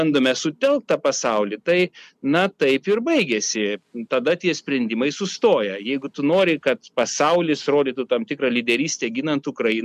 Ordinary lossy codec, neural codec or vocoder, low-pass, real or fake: MP3, 96 kbps; none; 14.4 kHz; real